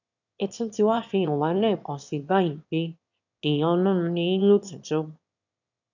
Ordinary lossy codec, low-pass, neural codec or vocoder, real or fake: none; 7.2 kHz; autoencoder, 22.05 kHz, a latent of 192 numbers a frame, VITS, trained on one speaker; fake